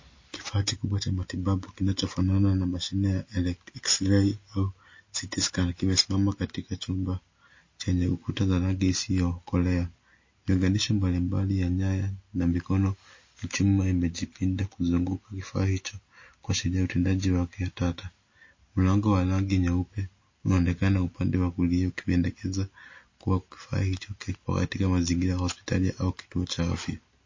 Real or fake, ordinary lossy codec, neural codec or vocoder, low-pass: real; MP3, 32 kbps; none; 7.2 kHz